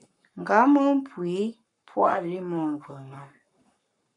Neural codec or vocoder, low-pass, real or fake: codec, 44.1 kHz, 7.8 kbps, Pupu-Codec; 10.8 kHz; fake